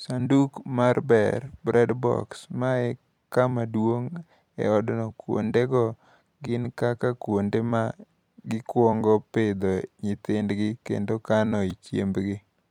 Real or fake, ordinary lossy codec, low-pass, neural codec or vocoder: fake; MP3, 96 kbps; 19.8 kHz; vocoder, 44.1 kHz, 128 mel bands every 256 samples, BigVGAN v2